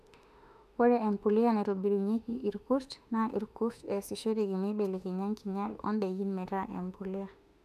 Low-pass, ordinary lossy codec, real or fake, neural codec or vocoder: 14.4 kHz; none; fake; autoencoder, 48 kHz, 32 numbers a frame, DAC-VAE, trained on Japanese speech